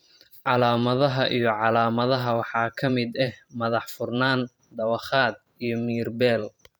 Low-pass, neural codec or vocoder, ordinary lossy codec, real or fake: none; vocoder, 44.1 kHz, 128 mel bands every 512 samples, BigVGAN v2; none; fake